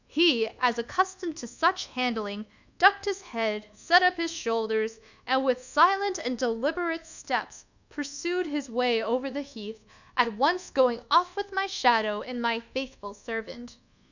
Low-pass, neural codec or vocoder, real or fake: 7.2 kHz; codec, 24 kHz, 1.2 kbps, DualCodec; fake